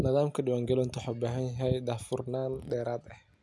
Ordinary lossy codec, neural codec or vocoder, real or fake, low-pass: none; none; real; none